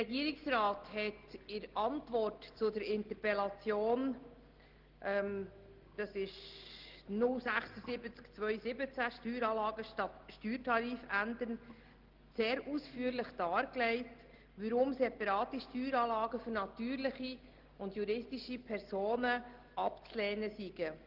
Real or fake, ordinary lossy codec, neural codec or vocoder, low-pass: real; Opus, 16 kbps; none; 5.4 kHz